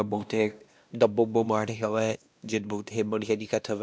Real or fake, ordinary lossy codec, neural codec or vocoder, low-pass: fake; none; codec, 16 kHz, 1 kbps, X-Codec, WavLM features, trained on Multilingual LibriSpeech; none